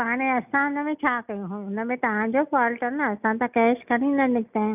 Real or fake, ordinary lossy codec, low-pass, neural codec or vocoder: real; none; 3.6 kHz; none